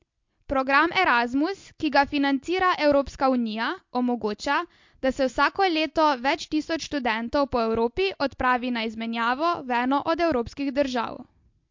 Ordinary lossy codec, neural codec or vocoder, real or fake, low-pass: MP3, 48 kbps; none; real; 7.2 kHz